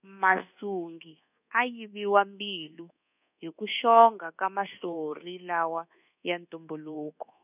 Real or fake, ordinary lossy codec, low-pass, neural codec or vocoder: fake; none; 3.6 kHz; codec, 24 kHz, 1.2 kbps, DualCodec